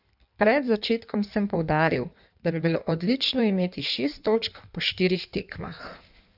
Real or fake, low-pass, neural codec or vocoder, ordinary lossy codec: fake; 5.4 kHz; codec, 16 kHz in and 24 kHz out, 1.1 kbps, FireRedTTS-2 codec; none